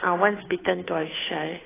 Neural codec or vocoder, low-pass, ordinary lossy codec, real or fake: codec, 16 kHz, 2 kbps, FunCodec, trained on Chinese and English, 25 frames a second; 3.6 kHz; AAC, 16 kbps; fake